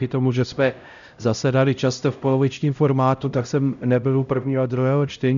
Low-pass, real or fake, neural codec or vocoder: 7.2 kHz; fake; codec, 16 kHz, 0.5 kbps, X-Codec, HuBERT features, trained on LibriSpeech